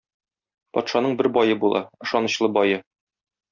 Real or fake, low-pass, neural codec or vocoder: real; 7.2 kHz; none